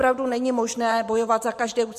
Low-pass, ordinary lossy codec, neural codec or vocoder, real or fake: 14.4 kHz; MP3, 64 kbps; vocoder, 44.1 kHz, 128 mel bands every 512 samples, BigVGAN v2; fake